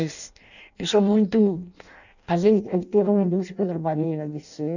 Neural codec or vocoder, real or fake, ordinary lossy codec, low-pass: codec, 16 kHz in and 24 kHz out, 0.6 kbps, FireRedTTS-2 codec; fake; none; 7.2 kHz